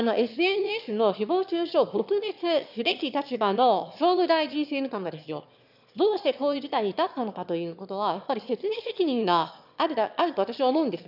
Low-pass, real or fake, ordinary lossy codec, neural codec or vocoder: 5.4 kHz; fake; none; autoencoder, 22.05 kHz, a latent of 192 numbers a frame, VITS, trained on one speaker